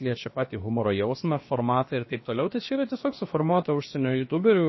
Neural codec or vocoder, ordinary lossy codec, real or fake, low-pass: codec, 16 kHz, about 1 kbps, DyCAST, with the encoder's durations; MP3, 24 kbps; fake; 7.2 kHz